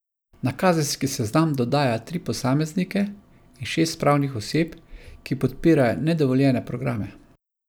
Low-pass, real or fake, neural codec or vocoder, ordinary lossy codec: none; real; none; none